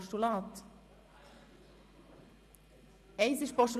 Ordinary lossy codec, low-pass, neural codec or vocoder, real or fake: none; 14.4 kHz; vocoder, 44.1 kHz, 128 mel bands every 512 samples, BigVGAN v2; fake